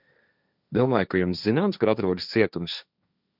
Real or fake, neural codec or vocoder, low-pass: fake; codec, 16 kHz, 1.1 kbps, Voila-Tokenizer; 5.4 kHz